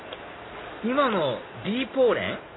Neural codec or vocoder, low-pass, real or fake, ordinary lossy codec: none; 7.2 kHz; real; AAC, 16 kbps